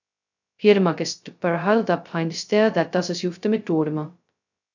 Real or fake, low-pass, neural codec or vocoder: fake; 7.2 kHz; codec, 16 kHz, 0.2 kbps, FocalCodec